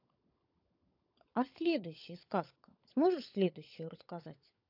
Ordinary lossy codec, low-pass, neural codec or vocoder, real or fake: AAC, 48 kbps; 5.4 kHz; codec, 16 kHz, 16 kbps, FunCodec, trained on LibriTTS, 50 frames a second; fake